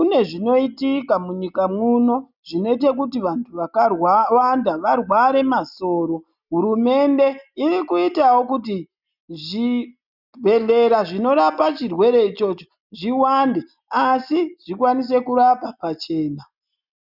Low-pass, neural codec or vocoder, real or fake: 5.4 kHz; none; real